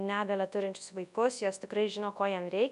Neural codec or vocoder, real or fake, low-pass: codec, 24 kHz, 0.9 kbps, WavTokenizer, large speech release; fake; 10.8 kHz